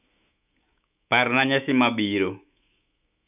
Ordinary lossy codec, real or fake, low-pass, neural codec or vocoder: none; fake; 3.6 kHz; autoencoder, 48 kHz, 128 numbers a frame, DAC-VAE, trained on Japanese speech